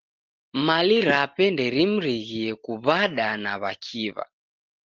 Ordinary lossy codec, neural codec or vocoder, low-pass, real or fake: Opus, 16 kbps; none; 7.2 kHz; real